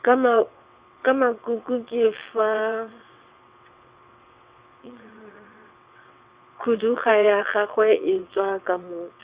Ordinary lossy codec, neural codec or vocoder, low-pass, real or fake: Opus, 64 kbps; vocoder, 22.05 kHz, 80 mel bands, WaveNeXt; 3.6 kHz; fake